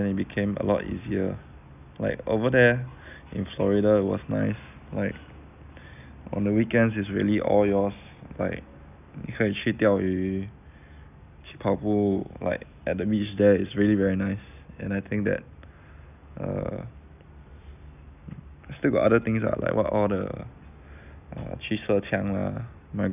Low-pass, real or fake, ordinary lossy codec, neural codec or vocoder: 3.6 kHz; real; none; none